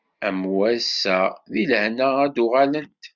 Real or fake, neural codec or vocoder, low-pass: real; none; 7.2 kHz